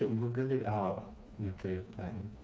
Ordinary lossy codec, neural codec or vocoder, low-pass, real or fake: none; codec, 16 kHz, 2 kbps, FreqCodec, smaller model; none; fake